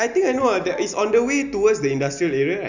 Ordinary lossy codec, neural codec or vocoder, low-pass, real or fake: none; none; 7.2 kHz; real